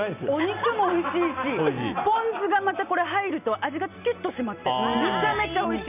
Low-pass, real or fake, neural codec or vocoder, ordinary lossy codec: 3.6 kHz; real; none; none